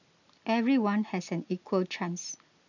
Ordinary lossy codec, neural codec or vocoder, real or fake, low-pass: none; none; real; 7.2 kHz